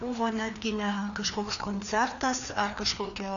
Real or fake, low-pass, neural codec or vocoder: fake; 7.2 kHz; codec, 16 kHz, 2 kbps, FreqCodec, larger model